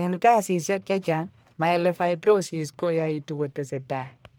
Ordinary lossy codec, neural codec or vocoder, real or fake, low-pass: none; codec, 44.1 kHz, 1.7 kbps, Pupu-Codec; fake; none